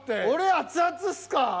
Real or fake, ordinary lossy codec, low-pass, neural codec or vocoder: real; none; none; none